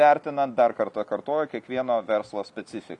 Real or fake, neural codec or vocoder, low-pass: real; none; 10.8 kHz